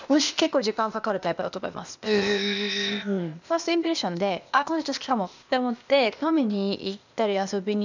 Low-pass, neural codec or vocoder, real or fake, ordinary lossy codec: 7.2 kHz; codec, 16 kHz, 0.8 kbps, ZipCodec; fake; none